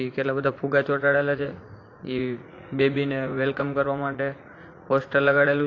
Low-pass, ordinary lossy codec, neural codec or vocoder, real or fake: 7.2 kHz; AAC, 32 kbps; vocoder, 44.1 kHz, 80 mel bands, Vocos; fake